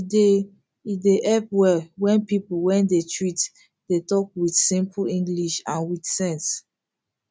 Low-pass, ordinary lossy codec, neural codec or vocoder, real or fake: none; none; none; real